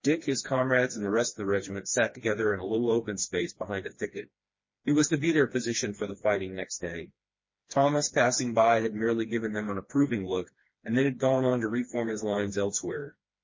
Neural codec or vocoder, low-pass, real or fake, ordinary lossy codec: codec, 16 kHz, 2 kbps, FreqCodec, smaller model; 7.2 kHz; fake; MP3, 32 kbps